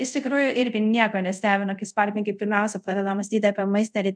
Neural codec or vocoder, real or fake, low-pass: codec, 24 kHz, 0.5 kbps, DualCodec; fake; 9.9 kHz